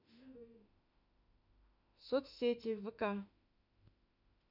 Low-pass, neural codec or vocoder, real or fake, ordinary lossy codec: 5.4 kHz; autoencoder, 48 kHz, 32 numbers a frame, DAC-VAE, trained on Japanese speech; fake; none